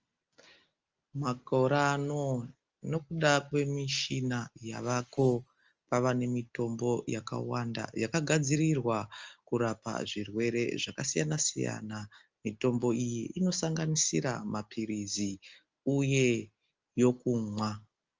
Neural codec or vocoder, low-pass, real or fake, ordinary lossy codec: none; 7.2 kHz; real; Opus, 24 kbps